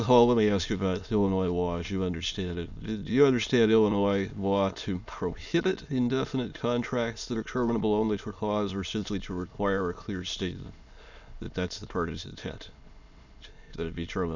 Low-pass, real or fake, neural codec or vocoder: 7.2 kHz; fake; autoencoder, 22.05 kHz, a latent of 192 numbers a frame, VITS, trained on many speakers